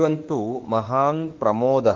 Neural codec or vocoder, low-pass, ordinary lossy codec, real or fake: autoencoder, 48 kHz, 32 numbers a frame, DAC-VAE, trained on Japanese speech; 7.2 kHz; Opus, 16 kbps; fake